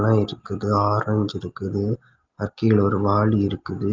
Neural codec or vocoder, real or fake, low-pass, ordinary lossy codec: none; real; 7.2 kHz; Opus, 32 kbps